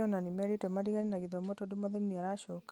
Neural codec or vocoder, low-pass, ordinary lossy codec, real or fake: none; 19.8 kHz; Opus, 32 kbps; real